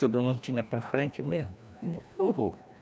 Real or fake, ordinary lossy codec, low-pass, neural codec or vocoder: fake; none; none; codec, 16 kHz, 1 kbps, FreqCodec, larger model